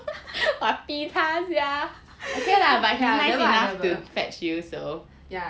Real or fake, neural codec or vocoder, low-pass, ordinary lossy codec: real; none; none; none